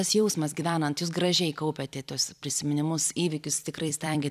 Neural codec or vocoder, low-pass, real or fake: vocoder, 44.1 kHz, 128 mel bands every 256 samples, BigVGAN v2; 14.4 kHz; fake